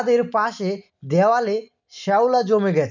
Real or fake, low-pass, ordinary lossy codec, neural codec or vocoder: real; 7.2 kHz; none; none